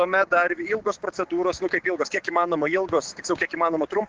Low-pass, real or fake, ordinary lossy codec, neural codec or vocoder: 7.2 kHz; fake; Opus, 16 kbps; codec, 16 kHz, 6 kbps, DAC